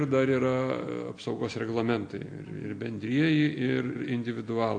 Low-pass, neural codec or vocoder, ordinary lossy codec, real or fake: 9.9 kHz; none; AAC, 48 kbps; real